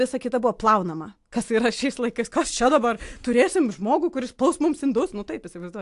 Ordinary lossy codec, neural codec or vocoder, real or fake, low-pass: AAC, 64 kbps; none; real; 10.8 kHz